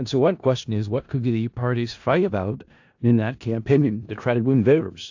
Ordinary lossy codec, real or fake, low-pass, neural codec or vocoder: AAC, 48 kbps; fake; 7.2 kHz; codec, 16 kHz in and 24 kHz out, 0.4 kbps, LongCat-Audio-Codec, four codebook decoder